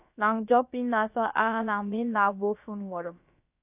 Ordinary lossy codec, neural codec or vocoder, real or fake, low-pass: AAC, 32 kbps; codec, 16 kHz, about 1 kbps, DyCAST, with the encoder's durations; fake; 3.6 kHz